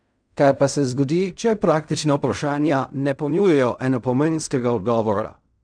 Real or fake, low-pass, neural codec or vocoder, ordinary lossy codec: fake; 9.9 kHz; codec, 16 kHz in and 24 kHz out, 0.4 kbps, LongCat-Audio-Codec, fine tuned four codebook decoder; none